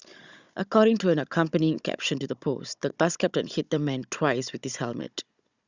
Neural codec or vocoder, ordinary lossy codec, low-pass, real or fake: codec, 16 kHz, 16 kbps, FunCodec, trained on Chinese and English, 50 frames a second; Opus, 64 kbps; 7.2 kHz; fake